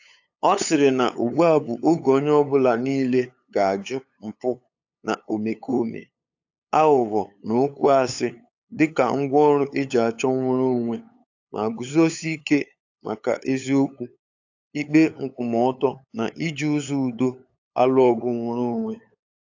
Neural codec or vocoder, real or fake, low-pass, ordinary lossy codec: codec, 16 kHz, 8 kbps, FunCodec, trained on LibriTTS, 25 frames a second; fake; 7.2 kHz; AAC, 48 kbps